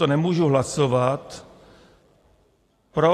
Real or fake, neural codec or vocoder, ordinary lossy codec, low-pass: real; none; AAC, 48 kbps; 14.4 kHz